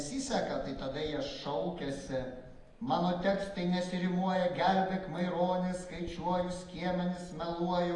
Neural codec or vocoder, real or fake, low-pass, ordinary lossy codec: none; real; 10.8 kHz; AAC, 32 kbps